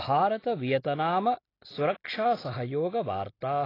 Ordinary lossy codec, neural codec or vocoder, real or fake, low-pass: AAC, 24 kbps; none; real; 5.4 kHz